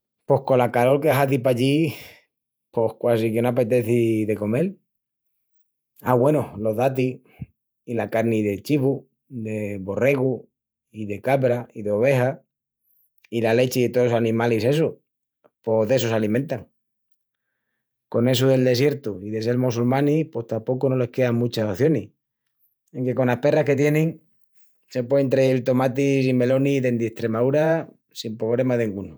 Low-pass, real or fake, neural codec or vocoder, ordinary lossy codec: none; fake; vocoder, 48 kHz, 128 mel bands, Vocos; none